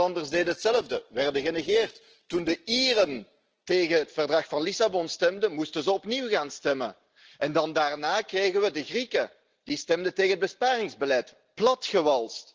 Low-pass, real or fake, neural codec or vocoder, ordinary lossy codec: 7.2 kHz; real; none; Opus, 16 kbps